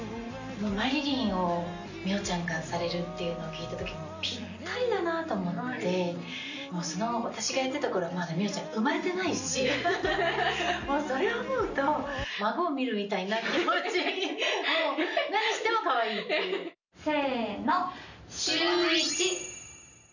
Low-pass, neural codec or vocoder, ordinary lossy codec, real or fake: 7.2 kHz; none; none; real